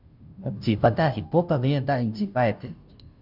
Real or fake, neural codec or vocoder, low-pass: fake; codec, 16 kHz, 0.5 kbps, FunCodec, trained on Chinese and English, 25 frames a second; 5.4 kHz